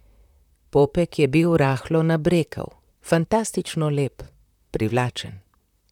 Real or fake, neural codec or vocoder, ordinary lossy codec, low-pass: fake; vocoder, 44.1 kHz, 128 mel bands, Pupu-Vocoder; none; 19.8 kHz